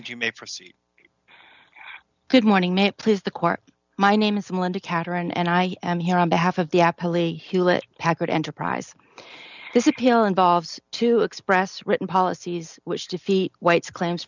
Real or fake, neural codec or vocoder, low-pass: real; none; 7.2 kHz